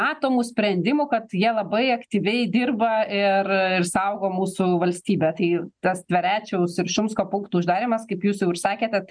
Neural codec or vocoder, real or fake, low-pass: none; real; 9.9 kHz